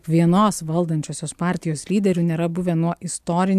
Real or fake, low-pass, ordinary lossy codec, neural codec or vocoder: real; 14.4 kHz; AAC, 96 kbps; none